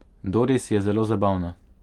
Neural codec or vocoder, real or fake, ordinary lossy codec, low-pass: none; real; Opus, 24 kbps; 19.8 kHz